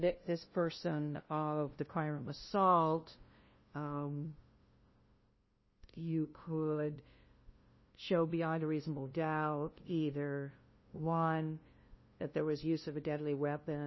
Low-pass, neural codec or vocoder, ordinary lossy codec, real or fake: 7.2 kHz; codec, 16 kHz, 0.5 kbps, FunCodec, trained on LibriTTS, 25 frames a second; MP3, 24 kbps; fake